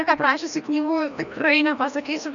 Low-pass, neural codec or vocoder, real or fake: 7.2 kHz; codec, 16 kHz, 1 kbps, FreqCodec, larger model; fake